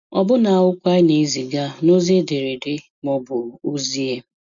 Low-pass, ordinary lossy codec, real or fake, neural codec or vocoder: 7.2 kHz; none; real; none